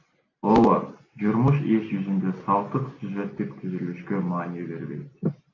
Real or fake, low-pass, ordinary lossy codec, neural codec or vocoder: real; 7.2 kHz; AAC, 32 kbps; none